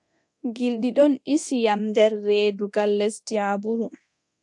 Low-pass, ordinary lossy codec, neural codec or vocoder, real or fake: 10.8 kHz; AAC, 64 kbps; codec, 24 kHz, 0.9 kbps, DualCodec; fake